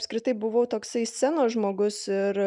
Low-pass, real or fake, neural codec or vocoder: 10.8 kHz; real; none